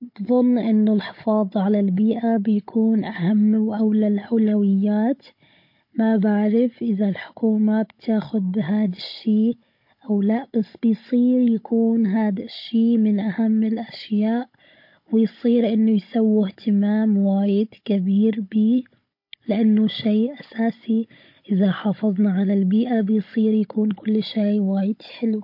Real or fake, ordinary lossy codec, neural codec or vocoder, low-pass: fake; MP3, 32 kbps; codec, 16 kHz, 16 kbps, FunCodec, trained on Chinese and English, 50 frames a second; 5.4 kHz